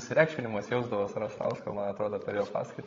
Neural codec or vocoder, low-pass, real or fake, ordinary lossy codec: codec, 16 kHz, 16 kbps, FreqCodec, larger model; 7.2 kHz; fake; AAC, 32 kbps